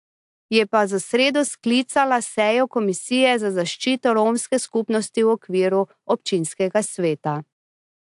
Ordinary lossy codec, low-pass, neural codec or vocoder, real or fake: AAC, 64 kbps; 10.8 kHz; none; real